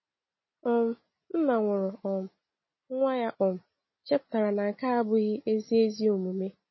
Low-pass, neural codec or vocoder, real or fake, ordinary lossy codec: 7.2 kHz; none; real; MP3, 24 kbps